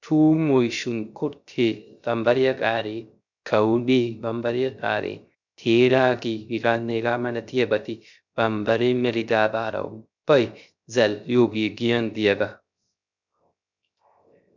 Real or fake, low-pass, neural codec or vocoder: fake; 7.2 kHz; codec, 16 kHz, 0.3 kbps, FocalCodec